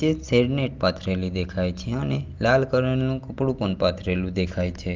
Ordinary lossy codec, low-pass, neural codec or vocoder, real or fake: Opus, 32 kbps; 7.2 kHz; none; real